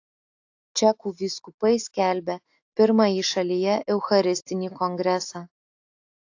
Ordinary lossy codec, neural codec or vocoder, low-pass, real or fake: AAC, 48 kbps; none; 7.2 kHz; real